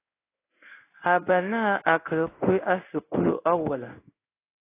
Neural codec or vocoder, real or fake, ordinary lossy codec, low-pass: codec, 16 kHz in and 24 kHz out, 1 kbps, XY-Tokenizer; fake; AAC, 24 kbps; 3.6 kHz